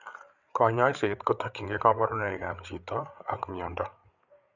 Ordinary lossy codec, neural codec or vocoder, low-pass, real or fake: none; codec, 16 kHz, 8 kbps, FreqCodec, larger model; 7.2 kHz; fake